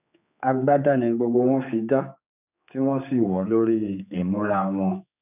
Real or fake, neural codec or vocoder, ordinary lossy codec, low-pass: fake; codec, 16 kHz, 4 kbps, X-Codec, HuBERT features, trained on general audio; none; 3.6 kHz